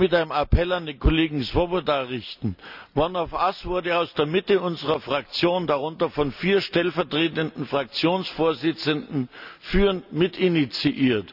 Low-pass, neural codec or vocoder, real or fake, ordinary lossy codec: 5.4 kHz; none; real; none